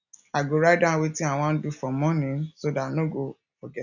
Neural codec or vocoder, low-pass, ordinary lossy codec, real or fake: none; 7.2 kHz; none; real